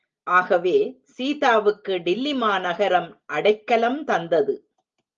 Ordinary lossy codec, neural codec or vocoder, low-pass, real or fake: Opus, 32 kbps; none; 7.2 kHz; real